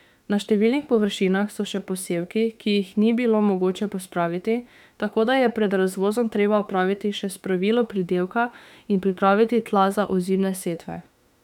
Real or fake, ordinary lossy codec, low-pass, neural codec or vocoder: fake; none; 19.8 kHz; autoencoder, 48 kHz, 32 numbers a frame, DAC-VAE, trained on Japanese speech